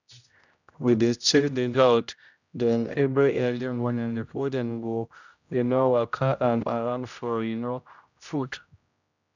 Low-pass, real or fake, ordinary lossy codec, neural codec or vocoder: 7.2 kHz; fake; none; codec, 16 kHz, 0.5 kbps, X-Codec, HuBERT features, trained on general audio